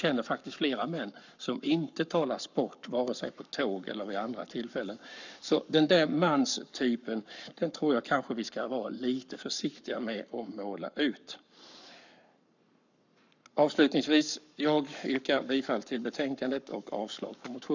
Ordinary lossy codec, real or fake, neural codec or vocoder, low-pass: none; fake; codec, 44.1 kHz, 7.8 kbps, Pupu-Codec; 7.2 kHz